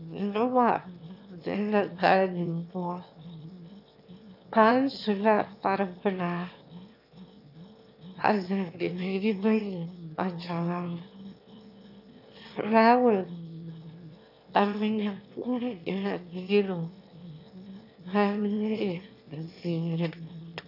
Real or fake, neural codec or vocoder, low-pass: fake; autoencoder, 22.05 kHz, a latent of 192 numbers a frame, VITS, trained on one speaker; 5.4 kHz